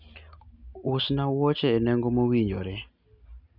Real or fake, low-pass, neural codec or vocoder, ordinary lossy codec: real; 5.4 kHz; none; none